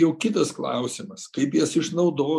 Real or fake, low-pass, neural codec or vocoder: real; 14.4 kHz; none